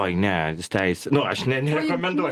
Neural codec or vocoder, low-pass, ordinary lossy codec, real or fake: none; 14.4 kHz; Opus, 24 kbps; real